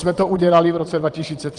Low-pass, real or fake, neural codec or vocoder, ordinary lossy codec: 9.9 kHz; fake; vocoder, 22.05 kHz, 80 mel bands, Vocos; Opus, 24 kbps